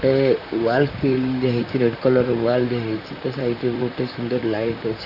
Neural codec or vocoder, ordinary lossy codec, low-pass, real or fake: vocoder, 44.1 kHz, 128 mel bands, Pupu-Vocoder; AAC, 48 kbps; 5.4 kHz; fake